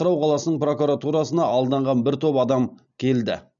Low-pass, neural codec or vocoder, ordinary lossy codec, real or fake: 7.2 kHz; none; none; real